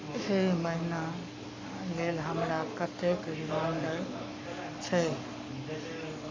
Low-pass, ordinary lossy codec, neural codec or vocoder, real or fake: 7.2 kHz; MP3, 48 kbps; codec, 44.1 kHz, 7.8 kbps, Pupu-Codec; fake